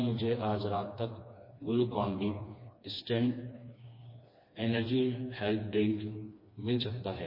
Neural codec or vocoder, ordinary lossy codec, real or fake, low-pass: codec, 16 kHz, 2 kbps, FreqCodec, smaller model; MP3, 24 kbps; fake; 5.4 kHz